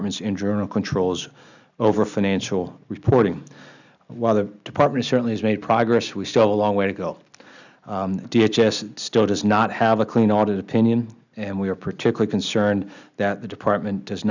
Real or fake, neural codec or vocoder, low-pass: real; none; 7.2 kHz